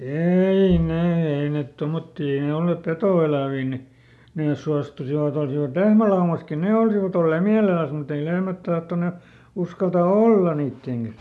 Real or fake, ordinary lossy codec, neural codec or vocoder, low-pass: real; none; none; none